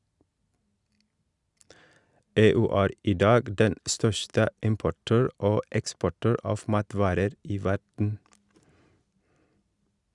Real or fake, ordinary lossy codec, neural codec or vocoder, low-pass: real; Opus, 64 kbps; none; 10.8 kHz